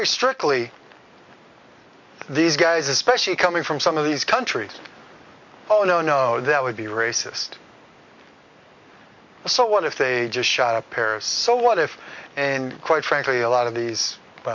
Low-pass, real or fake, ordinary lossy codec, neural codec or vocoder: 7.2 kHz; real; MP3, 48 kbps; none